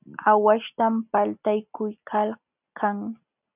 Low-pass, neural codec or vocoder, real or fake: 3.6 kHz; none; real